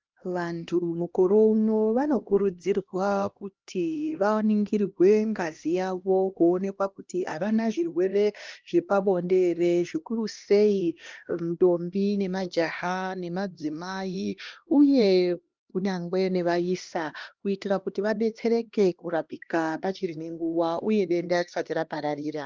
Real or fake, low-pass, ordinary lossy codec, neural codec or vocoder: fake; 7.2 kHz; Opus, 24 kbps; codec, 16 kHz, 1 kbps, X-Codec, HuBERT features, trained on LibriSpeech